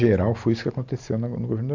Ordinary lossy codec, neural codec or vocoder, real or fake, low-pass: AAC, 48 kbps; none; real; 7.2 kHz